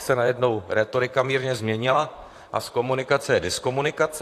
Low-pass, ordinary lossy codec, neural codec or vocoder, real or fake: 14.4 kHz; AAC, 64 kbps; vocoder, 44.1 kHz, 128 mel bands, Pupu-Vocoder; fake